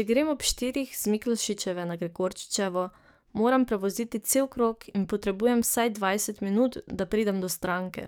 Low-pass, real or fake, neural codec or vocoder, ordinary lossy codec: none; fake; codec, 44.1 kHz, 7.8 kbps, DAC; none